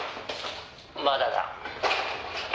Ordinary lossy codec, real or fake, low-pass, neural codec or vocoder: none; real; none; none